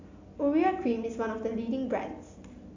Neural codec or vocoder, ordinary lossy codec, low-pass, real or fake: none; AAC, 48 kbps; 7.2 kHz; real